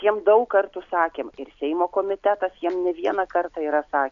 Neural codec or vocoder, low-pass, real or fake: none; 7.2 kHz; real